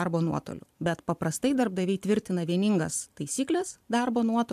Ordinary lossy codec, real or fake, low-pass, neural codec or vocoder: AAC, 96 kbps; fake; 14.4 kHz; vocoder, 44.1 kHz, 128 mel bands every 512 samples, BigVGAN v2